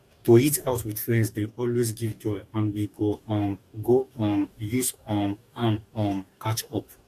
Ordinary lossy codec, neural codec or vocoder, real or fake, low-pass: MP3, 64 kbps; codec, 44.1 kHz, 2.6 kbps, DAC; fake; 14.4 kHz